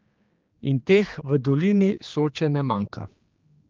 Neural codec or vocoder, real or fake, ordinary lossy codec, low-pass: codec, 16 kHz, 2 kbps, X-Codec, HuBERT features, trained on general audio; fake; Opus, 24 kbps; 7.2 kHz